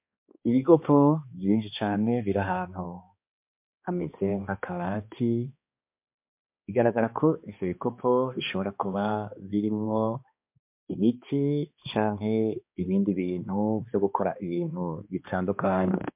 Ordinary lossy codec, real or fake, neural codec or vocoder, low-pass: MP3, 32 kbps; fake; codec, 16 kHz, 2 kbps, X-Codec, HuBERT features, trained on balanced general audio; 3.6 kHz